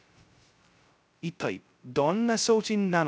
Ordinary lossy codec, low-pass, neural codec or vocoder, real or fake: none; none; codec, 16 kHz, 0.2 kbps, FocalCodec; fake